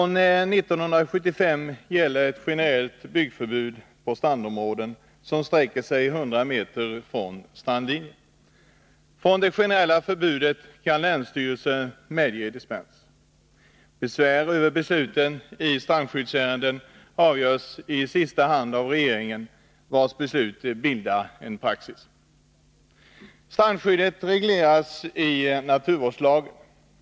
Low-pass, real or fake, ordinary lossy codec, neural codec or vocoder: none; real; none; none